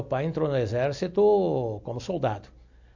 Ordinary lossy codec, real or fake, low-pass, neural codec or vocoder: MP3, 64 kbps; real; 7.2 kHz; none